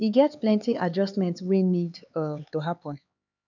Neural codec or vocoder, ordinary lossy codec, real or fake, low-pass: codec, 16 kHz, 4 kbps, X-Codec, HuBERT features, trained on LibriSpeech; none; fake; 7.2 kHz